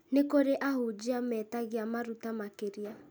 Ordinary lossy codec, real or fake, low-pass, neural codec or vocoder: none; real; none; none